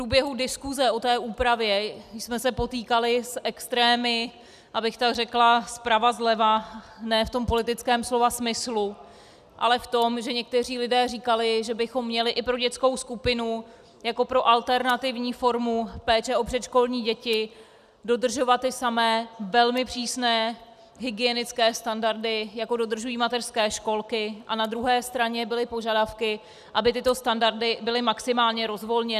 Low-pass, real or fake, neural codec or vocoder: 14.4 kHz; real; none